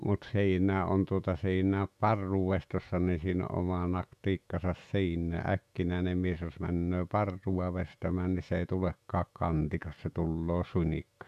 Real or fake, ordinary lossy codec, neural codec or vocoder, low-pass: fake; none; autoencoder, 48 kHz, 128 numbers a frame, DAC-VAE, trained on Japanese speech; 14.4 kHz